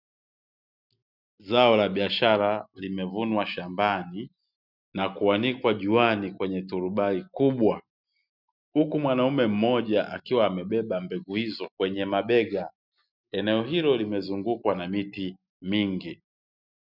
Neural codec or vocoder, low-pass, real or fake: none; 5.4 kHz; real